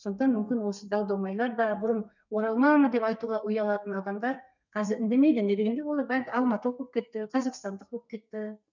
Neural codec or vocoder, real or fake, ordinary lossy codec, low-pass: codec, 32 kHz, 1.9 kbps, SNAC; fake; none; 7.2 kHz